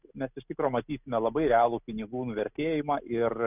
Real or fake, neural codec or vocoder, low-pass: real; none; 3.6 kHz